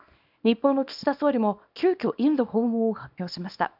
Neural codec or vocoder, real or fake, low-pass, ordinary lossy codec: codec, 24 kHz, 0.9 kbps, WavTokenizer, small release; fake; 5.4 kHz; AAC, 48 kbps